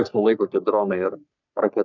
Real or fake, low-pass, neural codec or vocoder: fake; 7.2 kHz; codec, 44.1 kHz, 3.4 kbps, Pupu-Codec